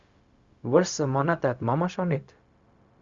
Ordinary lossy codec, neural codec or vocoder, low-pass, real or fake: Opus, 64 kbps; codec, 16 kHz, 0.4 kbps, LongCat-Audio-Codec; 7.2 kHz; fake